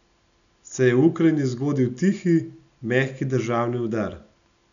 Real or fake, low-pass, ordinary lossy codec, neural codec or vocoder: real; 7.2 kHz; none; none